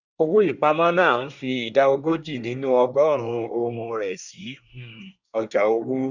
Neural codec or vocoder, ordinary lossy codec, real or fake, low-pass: codec, 24 kHz, 1 kbps, SNAC; Opus, 64 kbps; fake; 7.2 kHz